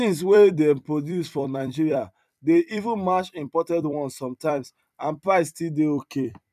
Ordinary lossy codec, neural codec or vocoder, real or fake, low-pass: none; vocoder, 44.1 kHz, 128 mel bands every 256 samples, BigVGAN v2; fake; 14.4 kHz